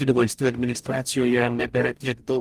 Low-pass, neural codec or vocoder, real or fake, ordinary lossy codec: 14.4 kHz; codec, 44.1 kHz, 0.9 kbps, DAC; fake; Opus, 32 kbps